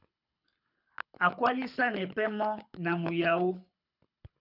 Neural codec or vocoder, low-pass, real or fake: codec, 24 kHz, 6 kbps, HILCodec; 5.4 kHz; fake